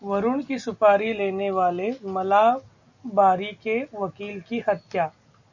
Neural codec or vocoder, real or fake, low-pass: none; real; 7.2 kHz